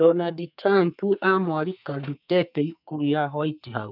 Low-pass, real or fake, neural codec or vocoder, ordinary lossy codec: 5.4 kHz; fake; codec, 32 kHz, 1.9 kbps, SNAC; none